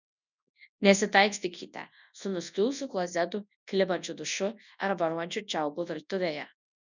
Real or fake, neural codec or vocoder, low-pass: fake; codec, 24 kHz, 0.9 kbps, WavTokenizer, large speech release; 7.2 kHz